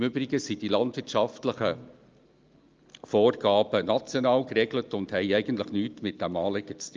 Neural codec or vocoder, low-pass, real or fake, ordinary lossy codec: none; 7.2 kHz; real; Opus, 24 kbps